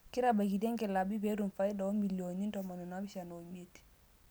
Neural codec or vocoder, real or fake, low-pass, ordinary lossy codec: none; real; none; none